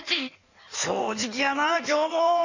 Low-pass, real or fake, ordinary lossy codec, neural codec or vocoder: 7.2 kHz; fake; none; codec, 16 kHz in and 24 kHz out, 1.1 kbps, FireRedTTS-2 codec